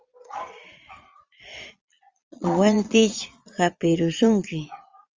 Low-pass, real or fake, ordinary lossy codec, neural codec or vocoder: 7.2 kHz; fake; Opus, 32 kbps; vocoder, 22.05 kHz, 80 mel bands, Vocos